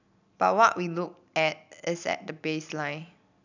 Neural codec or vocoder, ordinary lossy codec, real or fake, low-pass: none; none; real; 7.2 kHz